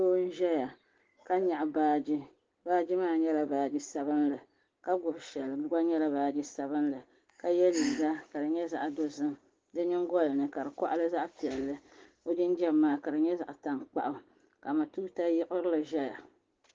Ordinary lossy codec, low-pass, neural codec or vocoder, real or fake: Opus, 16 kbps; 7.2 kHz; none; real